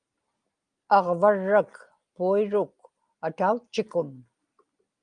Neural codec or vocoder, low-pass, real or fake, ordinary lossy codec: none; 9.9 kHz; real; Opus, 32 kbps